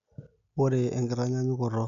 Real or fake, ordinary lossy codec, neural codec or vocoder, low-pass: real; none; none; 7.2 kHz